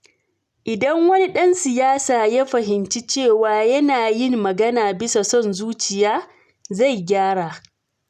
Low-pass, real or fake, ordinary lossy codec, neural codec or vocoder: 14.4 kHz; real; none; none